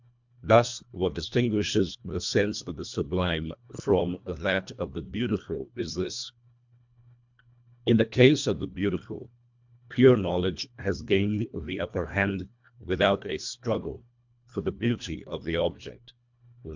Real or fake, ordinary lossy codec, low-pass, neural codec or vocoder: fake; MP3, 64 kbps; 7.2 kHz; codec, 24 kHz, 1.5 kbps, HILCodec